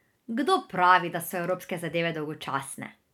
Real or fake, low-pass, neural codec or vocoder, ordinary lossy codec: real; 19.8 kHz; none; none